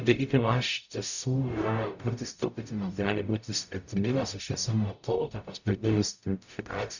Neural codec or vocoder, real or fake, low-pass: codec, 44.1 kHz, 0.9 kbps, DAC; fake; 7.2 kHz